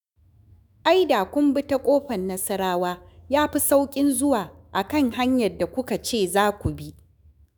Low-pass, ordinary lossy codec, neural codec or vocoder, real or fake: none; none; autoencoder, 48 kHz, 128 numbers a frame, DAC-VAE, trained on Japanese speech; fake